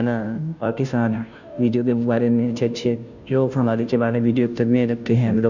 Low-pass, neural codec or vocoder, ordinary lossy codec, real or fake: 7.2 kHz; codec, 16 kHz, 0.5 kbps, FunCodec, trained on Chinese and English, 25 frames a second; none; fake